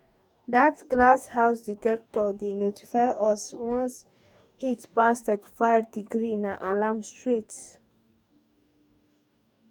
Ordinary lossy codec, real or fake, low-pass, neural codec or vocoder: none; fake; 19.8 kHz; codec, 44.1 kHz, 2.6 kbps, DAC